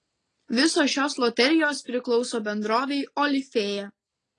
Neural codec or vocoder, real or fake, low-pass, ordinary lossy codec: none; real; 9.9 kHz; AAC, 32 kbps